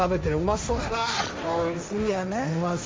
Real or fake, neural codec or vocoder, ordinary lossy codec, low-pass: fake; codec, 16 kHz, 1.1 kbps, Voila-Tokenizer; none; none